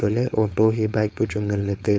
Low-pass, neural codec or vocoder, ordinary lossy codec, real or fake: none; codec, 16 kHz, 4.8 kbps, FACodec; none; fake